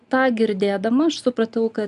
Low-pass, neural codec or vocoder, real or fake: 10.8 kHz; none; real